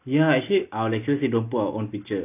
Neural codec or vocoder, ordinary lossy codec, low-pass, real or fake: none; none; 3.6 kHz; real